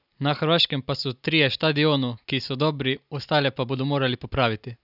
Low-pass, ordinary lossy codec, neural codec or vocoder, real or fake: 5.4 kHz; none; none; real